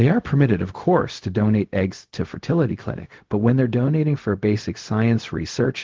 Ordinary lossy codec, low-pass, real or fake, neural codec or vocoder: Opus, 16 kbps; 7.2 kHz; fake; codec, 16 kHz, 0.4 kbps, LongCat-Audio-Codec